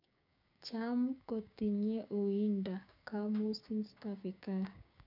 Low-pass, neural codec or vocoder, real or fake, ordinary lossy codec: 5.4 kHz; codec, 16 kHz, 6 kbps, DAC; fake; none